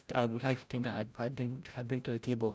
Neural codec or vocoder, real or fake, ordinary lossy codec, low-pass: codec, 16 kHz, 0.5 kbps, FreqCodec, larger model; fake; none; none